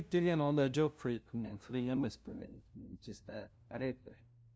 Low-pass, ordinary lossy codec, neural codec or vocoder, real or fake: none; none; codec, 16 kHz, 0.5 kbps, FunCodec, trained on LibriTTS, 25 frames a second; fake